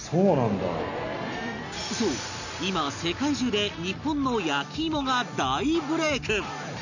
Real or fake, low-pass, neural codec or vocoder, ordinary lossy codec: real; 7.2 kHz; none; none